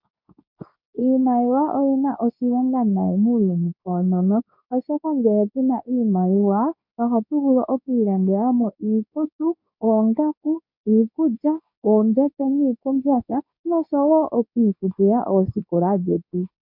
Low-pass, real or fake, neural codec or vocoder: 5.4 kHz; fake; codec, 24 kHz, 0.9 kbps, WavTokenizer, medium speech release version 2